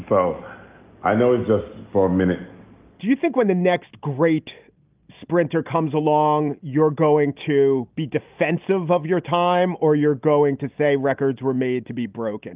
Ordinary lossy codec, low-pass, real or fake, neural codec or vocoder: Opus, 32 kbps; 3.6 kHz; real; none